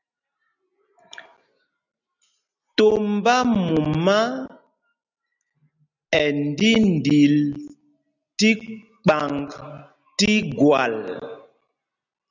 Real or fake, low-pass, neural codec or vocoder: real; 7.2 kHz; none